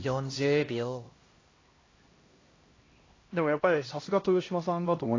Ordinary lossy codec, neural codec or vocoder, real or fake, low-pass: AAC, 32 kbps; codec, 16 kHz, 1 kbps, X-Codec, HuBERT features, trained on LibriSpeech; fake; 7.2 kHz